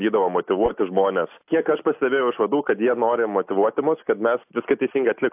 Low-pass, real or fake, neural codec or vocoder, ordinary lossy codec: 3.6 kHz; real; none; AAC, 32 kbps